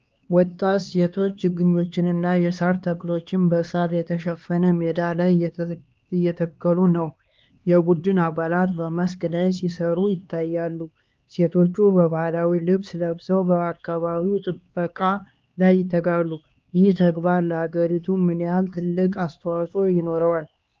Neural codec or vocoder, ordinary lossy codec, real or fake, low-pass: codec, 16 kHz, 2 kbps, X-Codec, HuBERT features, trained on LibriSpeech; Opus, 24 kbps; fake; 7.2 kHz